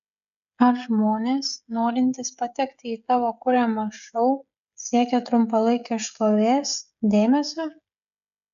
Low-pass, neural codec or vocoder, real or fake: 7.2 kHz; codec, 16 kHz, 16 kbps, FreqCodec, smaller model; fake